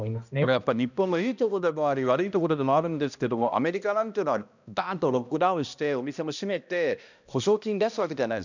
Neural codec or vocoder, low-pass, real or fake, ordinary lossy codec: codec, 16 kHz, 1 kbps, X-Codec, HuBERT features, trained on balanced general audio; 7.2 kHz; fake; none